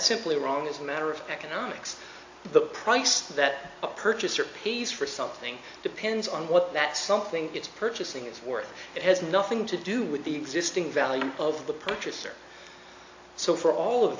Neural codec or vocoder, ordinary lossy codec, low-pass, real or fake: none; AAC, 48 kbps; 7.2 kHz; real